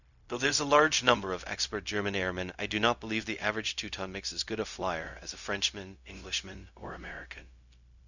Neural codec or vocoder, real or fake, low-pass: codec, 16 kHz, 0.4 kbps, LongCat-Audio-Codec; fake; 7.2 kHz